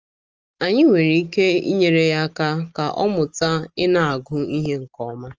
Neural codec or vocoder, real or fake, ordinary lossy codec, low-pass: none; real; Opus, 24 kbps; 7.2 kHz